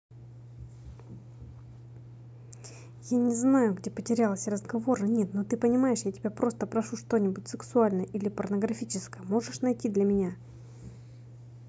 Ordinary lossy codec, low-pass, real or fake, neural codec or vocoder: none; none; real; none